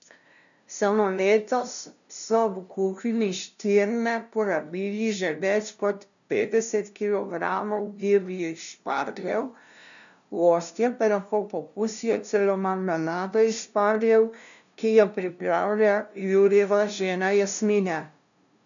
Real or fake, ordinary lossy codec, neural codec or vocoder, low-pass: fake; none; codec, 16 kHz, 0.5 kbps, FunCodec, trained on LibriTTS, 25 frames a second; 7.2 kHz